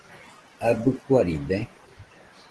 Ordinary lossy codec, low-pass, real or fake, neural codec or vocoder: Opus, 16 kbps; 10.8 kHz; real; none